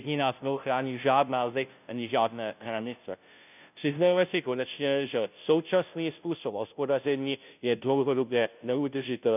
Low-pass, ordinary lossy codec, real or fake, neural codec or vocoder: 3.6 kHz; none; fake; codec, 16 kHz, 0.5 kbps, FunCodec, trained on Chinese and English, 25 frames a second